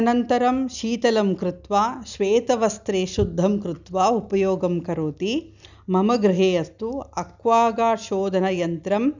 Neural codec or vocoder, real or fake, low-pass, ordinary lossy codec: none; real; 7.2 kHz; none